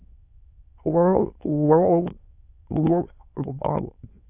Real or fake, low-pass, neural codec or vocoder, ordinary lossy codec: fake; 3.6 kHz; autoencoder, 22.05 kHz, a latent of 192 numbers a frame, VITS, trained on many speakers; AAC, 24 kbps